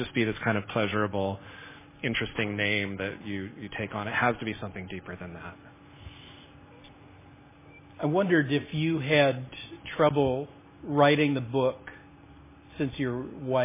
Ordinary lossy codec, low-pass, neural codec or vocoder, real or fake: MP3, 16 kbps; 3.6 kHz; none; real